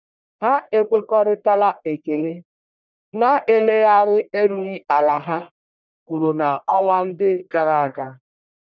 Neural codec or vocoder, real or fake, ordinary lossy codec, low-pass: codec, 44.1 kHz, 1.7 kbps, Pupu-Codec; fake; none; 7.2 kHz